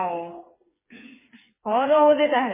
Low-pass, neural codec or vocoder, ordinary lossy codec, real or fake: 3.6 kHz; codec, 16 kHz, 4 kbps, FreqCodec, smaller model; MP3, 16 kbps; fake